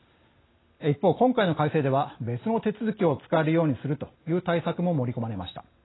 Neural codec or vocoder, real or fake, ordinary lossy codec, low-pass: none; real; AAC, 16 kbps; 7.2 kHz